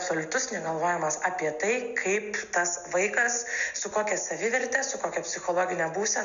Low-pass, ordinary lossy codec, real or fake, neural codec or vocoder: 7.2 kHz; AAC, 96 kbps; real; none